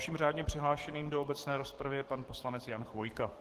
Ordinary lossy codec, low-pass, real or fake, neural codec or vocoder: Opus, 16 kbps; 14.4 kHz; fake; codec, 44.1 kHz, 7.8 kbps, DAC